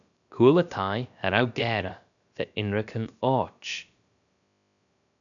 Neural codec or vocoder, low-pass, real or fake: codec, 16 kHz, about 1 kbps, DyCAST, with the encoder's durations; 7.2 kHz; fake